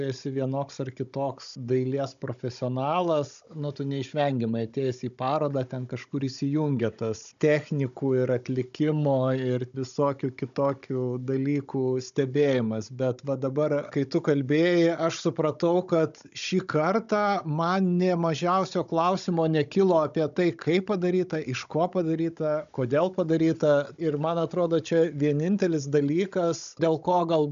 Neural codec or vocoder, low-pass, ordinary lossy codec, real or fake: codec, 16 kHz, 16 kbps, FunCodec, trained on Chinese and English, 50 frames a second; 7.2 kHz; AAC, 96 kbps; fake